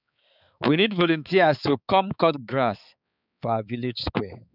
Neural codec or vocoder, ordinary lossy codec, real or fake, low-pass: codec, 16 kHz, 4 kbps, X-Codec, HuBERT features, trained on balanced general audio; none; fake; 5.4 kHz